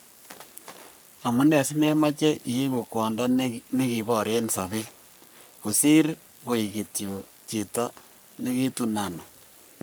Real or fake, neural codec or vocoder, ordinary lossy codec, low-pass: fake; codec, 44.1 kHz, 3.4 kbps, Pupu-Codec; none; none